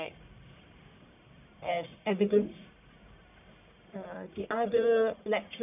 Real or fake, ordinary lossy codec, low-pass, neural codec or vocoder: fake; none; 3.6 kHz; codec, 44.1 kHz, 1.7 kbps, Pupu-Codec